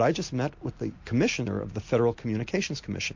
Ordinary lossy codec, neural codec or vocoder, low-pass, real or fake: MP3, 48 kbps; none; 7.2 kHz; real